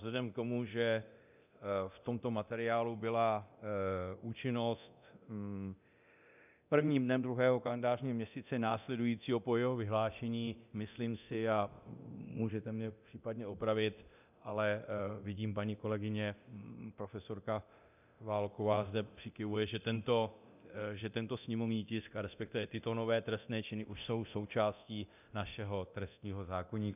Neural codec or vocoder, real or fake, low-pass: codec, 24 kHz, 0.9 kbps, DualCodec; fake; 3.6 kHz